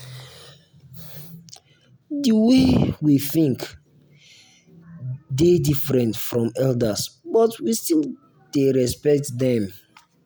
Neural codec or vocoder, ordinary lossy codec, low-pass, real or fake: none; none; none; real